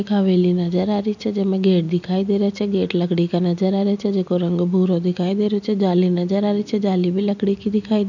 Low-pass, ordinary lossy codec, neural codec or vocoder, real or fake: 7.2 kHz; none; none; real